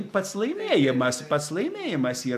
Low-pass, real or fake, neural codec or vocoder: 14.4 kHz; real; none